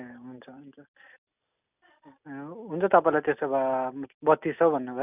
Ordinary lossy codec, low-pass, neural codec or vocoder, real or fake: none; 3.6 kHz; none; real